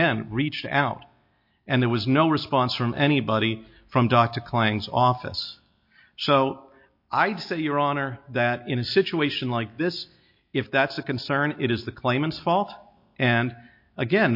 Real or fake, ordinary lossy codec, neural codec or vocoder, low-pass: real; MP3, 32 kbps; none; 5.4 kHz